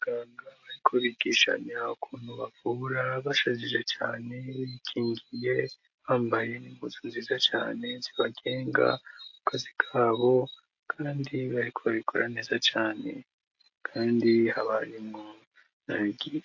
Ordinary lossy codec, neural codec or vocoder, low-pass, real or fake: AAC, 48 kbps; none; 7.2 kHz; real